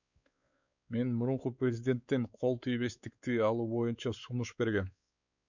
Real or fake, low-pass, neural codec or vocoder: fake; 7.2 kHz; codec, 16 kHz, 4 kbps, X-Codec, WavLM features, trained on Multilingual LibriSpeech